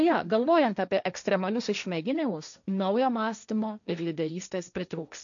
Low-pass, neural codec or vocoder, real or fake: 7.2 kHz; codec, 16 kHz, 1.1 kbps, Voila-Tokenizer; fake